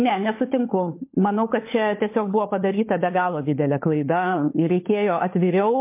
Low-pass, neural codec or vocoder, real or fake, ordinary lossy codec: 3.6 kHz; codec, 16 kHz, 16 kbps, FunCodec, trained on LibriTTS, 50 frames a second; fake; MP3, 24 kbps